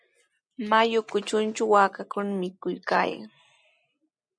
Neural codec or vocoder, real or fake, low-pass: none; real; 9.9 kHz